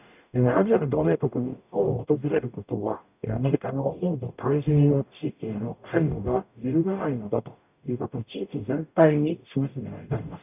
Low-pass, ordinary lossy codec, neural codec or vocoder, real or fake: 3.6 kHz; none; codec, 44.1 kHz, 0.9 kbps, DAC; fake